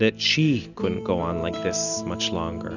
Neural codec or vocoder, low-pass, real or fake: none; 7.2 kHz; real